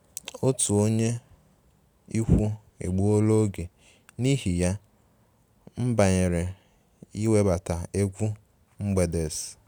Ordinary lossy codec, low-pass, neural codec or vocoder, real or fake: none; none; none; real